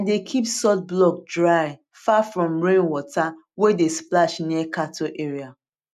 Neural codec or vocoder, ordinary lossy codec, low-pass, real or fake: none; none; 14.4 kHz; real